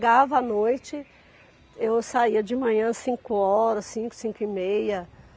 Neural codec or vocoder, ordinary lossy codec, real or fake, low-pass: none; none; real; none